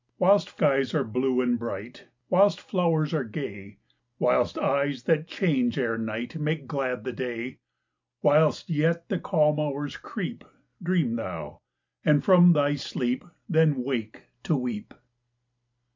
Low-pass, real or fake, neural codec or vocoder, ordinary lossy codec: 7.2 kHz; real; none; MP3, 64 kbps